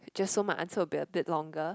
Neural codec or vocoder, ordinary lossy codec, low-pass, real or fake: none; none; none; real